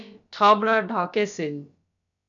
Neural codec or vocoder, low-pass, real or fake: codec, 16 kHz, about 1 kbps, DyCAST, with the encoder's durations; 7.2 kHz; fake